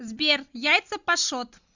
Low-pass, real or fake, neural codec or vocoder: 7.2 kHz; real; none